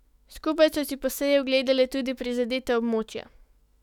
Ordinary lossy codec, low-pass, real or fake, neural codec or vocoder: none; 19.8 kHz; fake; autoencoder, 48 kHz, 128 numbers a frame, DAC-VAE, trained on Japanese speech